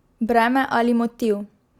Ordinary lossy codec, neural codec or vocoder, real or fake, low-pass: Opus, 64 kbps; none; real; 19.8 kHz